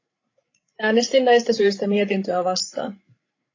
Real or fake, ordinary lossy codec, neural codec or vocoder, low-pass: fake; AAC, 32 kbps; codec, 16 kHz, 16 kbps, FreqCodec, larger model; 7.2 kHz